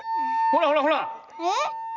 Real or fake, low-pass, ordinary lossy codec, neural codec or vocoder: real; 7.2 kHz; none; none